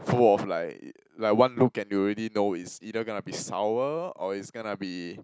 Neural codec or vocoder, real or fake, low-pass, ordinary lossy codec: none; real; none; none